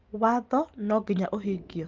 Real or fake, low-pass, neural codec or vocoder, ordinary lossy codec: real; 7.2 kHz; none; Opus, 32 kbps